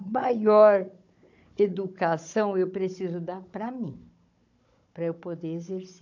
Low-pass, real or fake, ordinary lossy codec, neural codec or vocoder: 7.2 kHz; fake; AAC, 48 kbps; codec, 16 kHz, 16 kbps, FunCodec, trained on Chinese and English, 50 frames a second